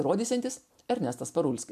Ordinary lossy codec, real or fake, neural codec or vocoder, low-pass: MP3, 96 kbps; real; none; 14.4 kHz